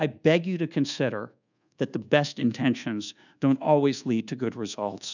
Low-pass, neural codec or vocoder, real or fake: 7.2 kHz; codec, 24 kHz, 1.2 kbps, DualCodec; fake